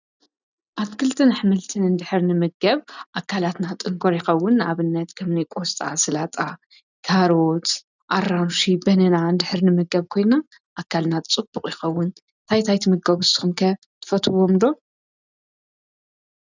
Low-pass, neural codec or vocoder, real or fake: 7.2 kHz; none; real